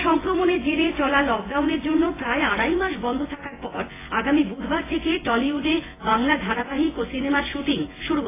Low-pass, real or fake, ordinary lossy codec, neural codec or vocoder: 3.6 kHz; fake; AAC, 16 kbps; vocoder, 24 kHz, 100 mel bands, Vocos